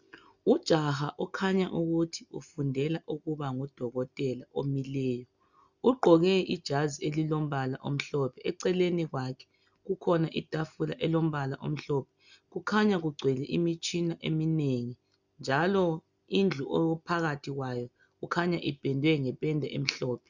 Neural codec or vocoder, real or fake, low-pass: none; real; 7.2 kHz